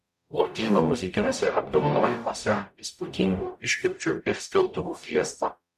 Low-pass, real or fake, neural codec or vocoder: 14.4 kHz; fake; codec, 44.1 kHz, 0.9 kbps, DAC